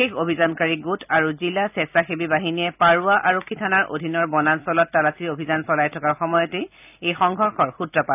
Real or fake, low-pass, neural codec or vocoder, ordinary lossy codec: real; 3.6 kHz; none; none